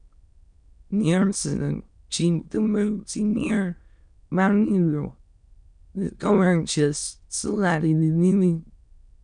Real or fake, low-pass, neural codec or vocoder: fake; 9.9 kHz; autoencoder, 22.05 kHz, a latent of 192 numbers a frame, VITS, trained on many speakers